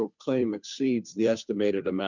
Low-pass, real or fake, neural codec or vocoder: 7.2 kHz; fake; codec, 16 kHz, 2 kbps, FunCodec, trained on Chinese and English, 25 frames a second